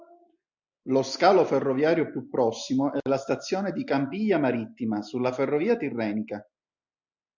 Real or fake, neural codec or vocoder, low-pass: real; none; 7.2 kHz